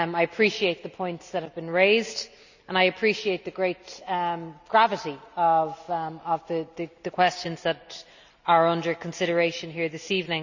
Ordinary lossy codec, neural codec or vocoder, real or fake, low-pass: none; none; real; 7.2 kHz